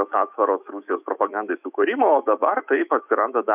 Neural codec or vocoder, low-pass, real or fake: autoencoder, 48 kHz, 128 numbers a frame, DAC-VAE, trained on Japanese speech; 3.6 kHz; fake